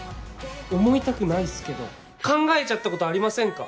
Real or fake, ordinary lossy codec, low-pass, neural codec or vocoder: real; none; none; none